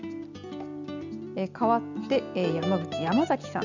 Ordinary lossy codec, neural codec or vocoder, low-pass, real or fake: none; none; 7.2 kHz; real